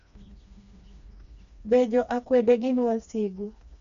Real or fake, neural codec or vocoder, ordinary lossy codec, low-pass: fake; codec, 16 kHz, 2 kbps, FreqCodec, smaller model; MP3, 64 kbps; 7.2 kHz